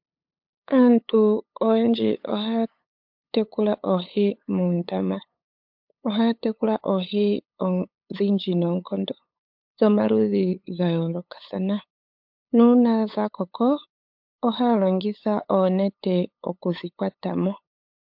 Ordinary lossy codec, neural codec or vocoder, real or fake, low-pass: MP3, 48 kbps; codec, 16 kHz, 8 kbps, FunCodec, trained on LibriTTS, 25 frames a second; fake; 5.4 kHz